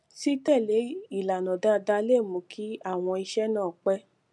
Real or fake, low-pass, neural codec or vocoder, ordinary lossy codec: real; none; none; none